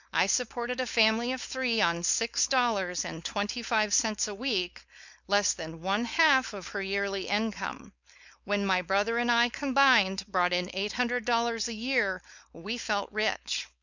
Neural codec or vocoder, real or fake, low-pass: codec, 16 kHz, 4.8 kbps, FACodec; fake; 7.2 kHz